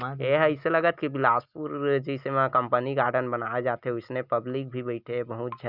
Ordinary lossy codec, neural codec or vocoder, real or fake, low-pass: Opus, 64 kbps; none; real; 5.4 kHz